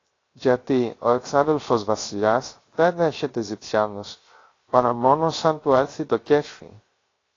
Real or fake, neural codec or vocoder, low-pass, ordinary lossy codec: fake; codec, 16 kHz, 0.3 kbps, FocalCodec; 7.2 kHz; AAC, 32 kbps